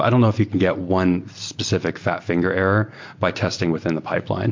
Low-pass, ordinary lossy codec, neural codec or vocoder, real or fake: 7.2 kHz; MP3, 48 kbps; none; real